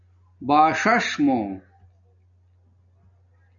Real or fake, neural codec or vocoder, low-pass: real; none; 7.2 kHz